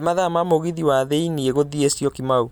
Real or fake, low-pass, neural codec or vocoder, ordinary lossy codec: real; none; none; none